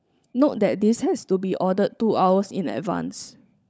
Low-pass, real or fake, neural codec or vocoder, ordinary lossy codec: none; fake; codec, 16 kHz, 16 kbps, FunCodec, trained on LibriTTS, 50 frames a second; none